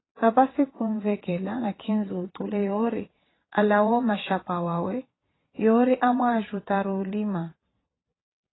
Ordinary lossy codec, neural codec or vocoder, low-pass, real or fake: AAC, 16 kbps; vocoder, 22.05 kHz, 80 mel bands, Vocos; 7.2 kHz; fake